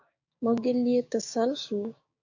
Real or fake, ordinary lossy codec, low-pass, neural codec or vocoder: fake; AAC, 48 kbps; 7.2 kHz; codec, 16 kHz, 6 kbps, DAC